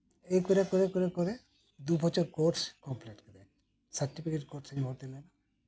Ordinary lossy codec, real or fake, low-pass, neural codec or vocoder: none; real; none; none